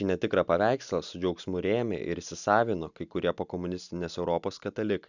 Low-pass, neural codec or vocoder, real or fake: 7.2 kHz; none; real